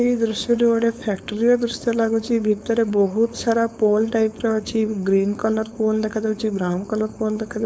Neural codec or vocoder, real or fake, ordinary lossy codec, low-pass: codec, 16 kHz, 4.8 kbps, FACodec; fake; none; none